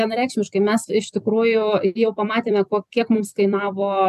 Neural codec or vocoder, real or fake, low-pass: vocoder, 44.1 kHz, 128 mel bands every 256 samples, BigVGAN v2; fake; 14.4 kHz